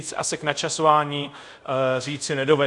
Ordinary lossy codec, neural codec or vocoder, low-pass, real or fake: Opus, 64 kbps; codec, 24 kHz, 0.5 kbps, DualCodec; 10.8 kHz; fake